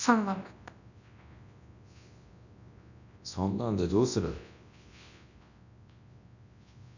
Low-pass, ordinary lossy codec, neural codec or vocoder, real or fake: 7.2 kHz; none; codec, 24 kHz, 0.9 kbps, WavTokenizer, large speech release; fake